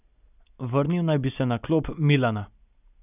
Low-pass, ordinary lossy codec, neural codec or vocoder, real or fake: 3.6 kHz; none; none; real